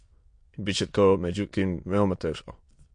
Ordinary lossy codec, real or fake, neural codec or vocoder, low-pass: MP3, 48 kbps; fake; autoencoder, 22.05 kHz, a latent of 192 numbers a frame, VITS, trained on many speakers; 9.9 kHz